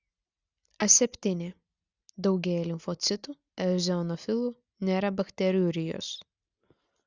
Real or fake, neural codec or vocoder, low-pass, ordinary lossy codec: real; none; 7.2 kHz; Opus, 64 kbps